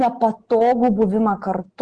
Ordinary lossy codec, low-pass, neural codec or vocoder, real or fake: Opus, 16 kbps; 9.9 kHz; none; real